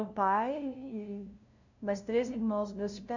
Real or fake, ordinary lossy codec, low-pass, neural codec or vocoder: fake; none; 7.2 kHz; codec, 16 kHz, 0.5 kbps, FunCodec, trained on LibriTTS, 25 frames a second